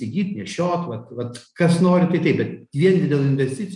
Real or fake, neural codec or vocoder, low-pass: real; none; 10.8 kHz